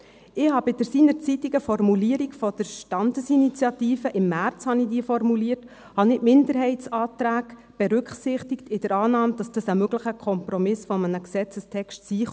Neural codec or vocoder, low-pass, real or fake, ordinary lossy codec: none; none; real; none